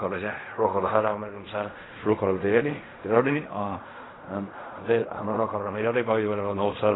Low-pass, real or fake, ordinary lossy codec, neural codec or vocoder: 7.2 kHz; fake; AAC, 16 kbps; codec, 16 kHz in and 24 kHz out, 0.4 kbps, LongCat-Audio-Codec, fine tuned four codebook decoder